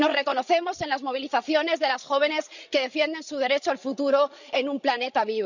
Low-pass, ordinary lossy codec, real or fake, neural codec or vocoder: 7.2 kHz; none; fake; codec, 16 kHz, 16 kbps, FreqCodec, larger model